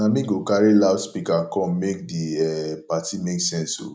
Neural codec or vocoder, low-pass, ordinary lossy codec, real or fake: none; none; none; real